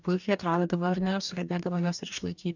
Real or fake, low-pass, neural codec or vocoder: fake; 7.2 kHz; codec, 44.1 kHz, 2.6 kbps, DAC